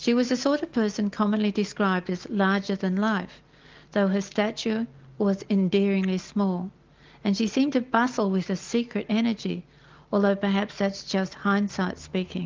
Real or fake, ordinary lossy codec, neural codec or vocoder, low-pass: real; Opus, 32 kbps; none; 7.2 kHz